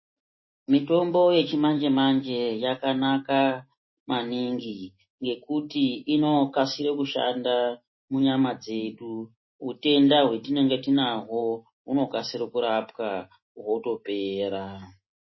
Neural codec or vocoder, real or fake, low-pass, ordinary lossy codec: none; real; 7.2 kHz; MP3, 24 kbps